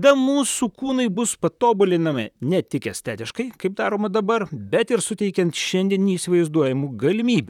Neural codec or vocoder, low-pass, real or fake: vocoder, 44.1 kHz, 128 mel bands, Pupu-Vocoder; 19.8 kHz; fake